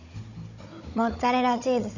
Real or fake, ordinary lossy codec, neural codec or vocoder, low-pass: fake; none; codec, 16 kHz, 16 kbps, FunCodec, trained on Chinese and English, 50 frames a second; 7.2 kHz